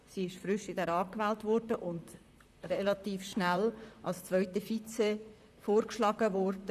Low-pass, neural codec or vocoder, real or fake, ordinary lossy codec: 14.4 kHz; vocoder, 44.1 kHz, 128 mel bands, Pupu-Vocoder; fake; none